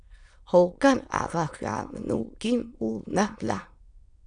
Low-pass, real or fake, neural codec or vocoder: 9.9 kHz; fake; autoencoder, 22.05 kHz, a latent of 192 numbers a frame, VITS, trained on many speakers